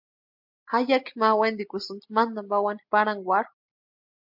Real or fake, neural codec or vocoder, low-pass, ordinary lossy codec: real; none; 5.4 kHz; MP3, 48 kbps